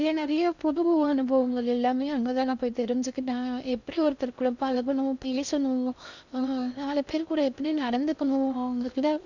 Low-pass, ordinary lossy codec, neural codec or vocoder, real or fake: 7.2 kHz; none; codec, 16 kHz in and 24 kHz out, 0.6 kbps, FocalCodec, streaming, 2048 codes; fake